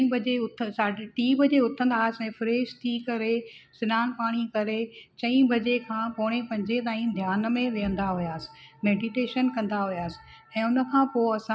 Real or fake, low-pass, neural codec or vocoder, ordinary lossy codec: real; none; none; none